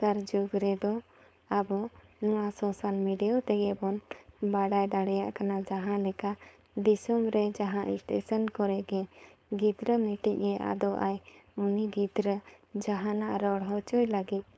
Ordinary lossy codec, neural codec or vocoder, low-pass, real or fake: none; codec, 16 kHz, 4.8 kbps, FACodec; none; fake